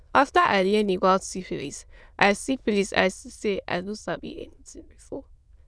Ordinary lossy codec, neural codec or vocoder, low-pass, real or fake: none; autoencoder, 22.05 kHz, a latent of 192 numbers a frame, VITS, trained on many speakers; none; fake